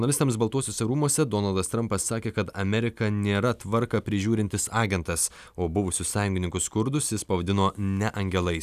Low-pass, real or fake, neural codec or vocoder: 14.4 kHz; real; none